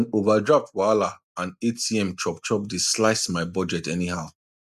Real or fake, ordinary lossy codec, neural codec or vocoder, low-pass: real; none; none; 14.4 kHz